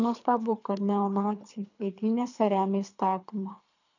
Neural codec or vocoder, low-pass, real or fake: codec, 24 kHz, 3 kbps, HILCodec; 7.2 kHz; fake